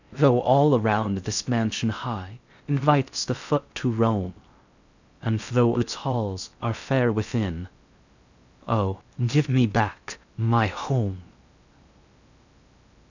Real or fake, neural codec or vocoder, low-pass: fake; codec, 16 kHz in and 24 kHz out, 0.6 kbps, FocalCodec, streaming, 4096 codes; 7.2 kHz